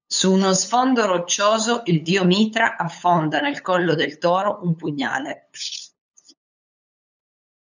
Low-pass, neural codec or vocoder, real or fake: 7.2 kHz; codec, 16 kHz, 8 kbps, FunCodec, trained on LibriTTS, 25 frames a second; fake